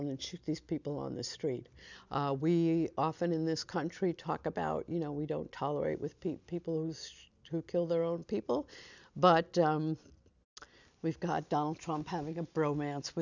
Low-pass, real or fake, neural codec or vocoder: 7.2 kHz; real; none